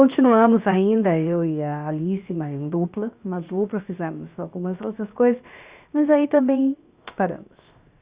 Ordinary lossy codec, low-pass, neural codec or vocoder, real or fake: Opus, 64 kbps; 3.6 kHz; codec, 16 kHz, 0.7 kbps, FocalCodec; fake